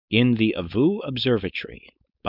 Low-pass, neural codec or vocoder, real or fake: 5.4 kHz; codec, 16 kHz, 4.8 kbps, FACodec; fake